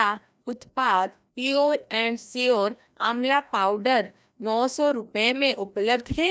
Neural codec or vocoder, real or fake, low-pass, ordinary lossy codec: codec, 16 kHz, 1 kbps, FreqCodec, larger model; fake; none; none